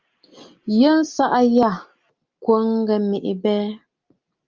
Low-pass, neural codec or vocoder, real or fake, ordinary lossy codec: 7.2 kHz; none; real; Opus, 32 kbps